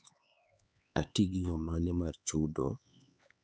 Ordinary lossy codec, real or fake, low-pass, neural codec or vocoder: none; fake; none; codec, 16 kHz, 4 kbps, X-Codec, HuBERT features, trained on LibriSpeech